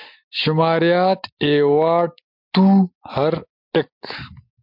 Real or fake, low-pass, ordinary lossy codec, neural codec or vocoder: real; 5.4 kHz; MP3, 48 kbps; none